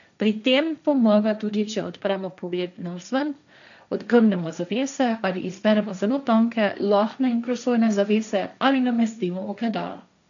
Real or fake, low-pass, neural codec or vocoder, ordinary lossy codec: fake; 7.2 kHz; codec, 16 kHz, 1.1 kbps, Voila-Tokenizer; none